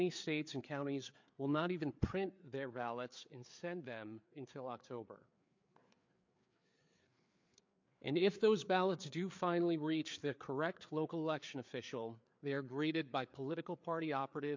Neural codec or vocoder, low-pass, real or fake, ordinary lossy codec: codec, 16 kHz, 4 kbps, FreqCodec, larger model; 7.2 kHz; fake; MP3, 48 kbps